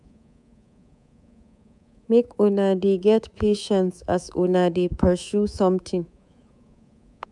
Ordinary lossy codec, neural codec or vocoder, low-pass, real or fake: none; codec, 24 kHz, 3.1 kbps, DualCodec; 10.8 kHz; fake